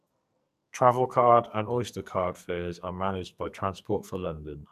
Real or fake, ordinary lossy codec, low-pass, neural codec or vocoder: fake; none; 14.4 kHz; codec, 44.1 kHz, 2.6 kbps, SNAC